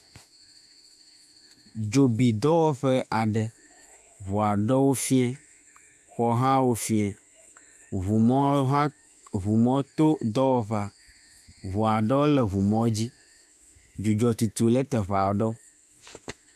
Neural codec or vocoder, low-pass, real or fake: autoencoder, 48 kHz, 32 numbers a frame, DAC-VAE, trained on Japanese speech; 14.4 kHz; fake